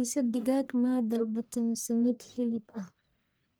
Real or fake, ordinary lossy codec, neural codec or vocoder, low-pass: fake; none; codec, 44.1 kHz, 1.7 kbps, Pupu-Codec; none